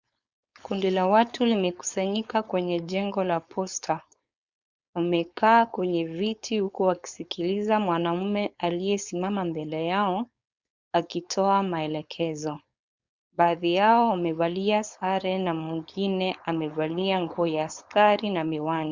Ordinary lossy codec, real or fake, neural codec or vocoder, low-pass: Opus, 64 kbps; fake; codec, 16 kHz, 4.8 kbps, FACodec; 7.2 kHz